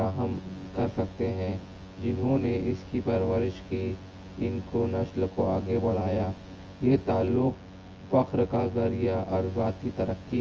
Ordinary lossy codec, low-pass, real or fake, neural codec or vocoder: Opus, 24 kbps; 7.2 kHz; fake; vocoder, 24 kHz, 100 mel bands, Vocos